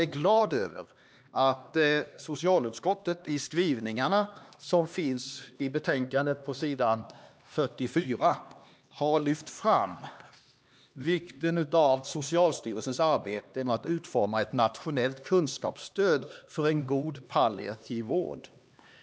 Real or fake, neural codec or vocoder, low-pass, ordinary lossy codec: fake; codec, 16 kHz, 2 kbps, X-Codec, HuBERT features, trained on LibriSpeech; none; none